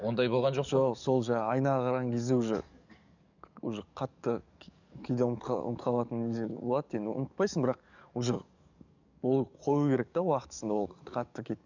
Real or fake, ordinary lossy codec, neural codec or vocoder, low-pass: fake; none; codec, 44.1 kHz, 7.8 kbps, DAC; 7.2 kHz